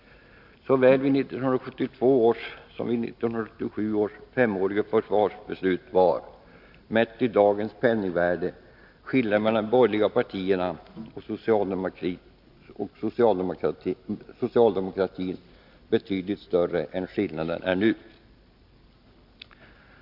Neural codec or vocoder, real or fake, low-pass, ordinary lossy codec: none; real; 5.4 kHz; none